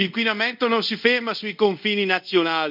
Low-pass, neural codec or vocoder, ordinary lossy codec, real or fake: 5.4 kHz; codec, 24 kHz, 0.5 kbps, DualCodec; MP3, 48 kbps; fake